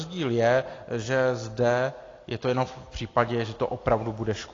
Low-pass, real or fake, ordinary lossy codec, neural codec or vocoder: 7.2 kHz; real; AAC, 32 kbps; none